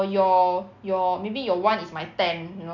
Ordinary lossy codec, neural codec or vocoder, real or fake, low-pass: none; none; real; 7.2 kHz